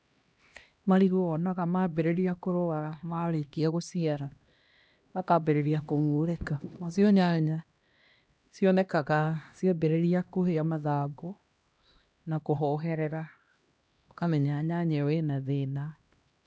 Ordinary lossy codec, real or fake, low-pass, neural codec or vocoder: none; fake; none; codec, 16 kHz, 1 kbps, X-Codec, HuBERT features, trained on LibriSpeech